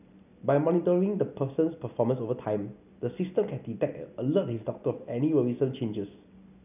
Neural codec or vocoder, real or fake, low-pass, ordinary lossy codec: none; real; 3.6 kHz; none